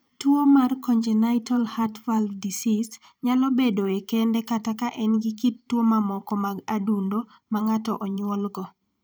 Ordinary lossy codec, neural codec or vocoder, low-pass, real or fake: none; vocoder, 44.1 kHz, 128 mel bands every 512 samples, BigVGAN v2; none; fake